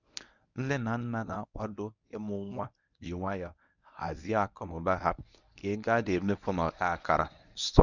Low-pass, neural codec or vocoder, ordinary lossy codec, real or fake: 7.2 kHz; codec, 24 kHz, 0.9 kbps, WavTokenizer, medium speech release version 1; none; fake